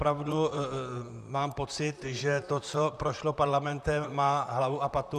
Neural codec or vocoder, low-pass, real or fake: vocoder, 44.1 kHz, 128 mel bands, Pupu-Vocoder; 14.4 kHz; fake